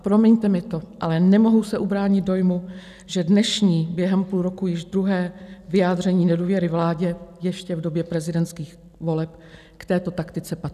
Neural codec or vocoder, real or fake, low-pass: none; real; 14.4 kHz